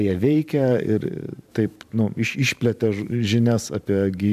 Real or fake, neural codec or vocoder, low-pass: fake; vocoder, 44.1 kHz, 128 mel bands every 512 samples, BigVGAN v2; 14.4 kHz